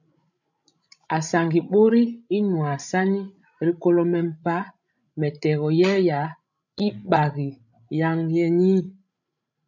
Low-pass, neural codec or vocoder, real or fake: 7.2 kHz; codec, 16 kHz, 16 kbps, FreqCodec, larger model; fake